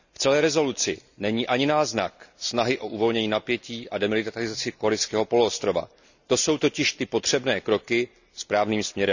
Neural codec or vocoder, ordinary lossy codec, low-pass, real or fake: none; none; 7.2 kHz; real